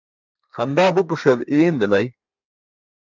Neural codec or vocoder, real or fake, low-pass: codec, 32 kHz, 1.9 kbps, SNAC; fake; 7.2 kHz